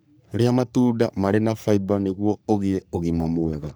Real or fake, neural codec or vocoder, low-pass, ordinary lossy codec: fake; codec, 44.1 kHz, 3.4 kbps, Pupu-Codec; none; none